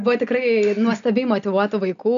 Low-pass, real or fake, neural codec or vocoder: 7.2 kHz; real; none